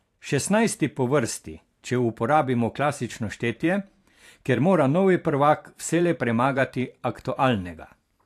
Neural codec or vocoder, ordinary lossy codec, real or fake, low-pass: vocoder, 44.1 kHz, 128 mel bands every 512 samples, BigVGAN v2; AAC, 64 kbps; fake; 14.4 kHz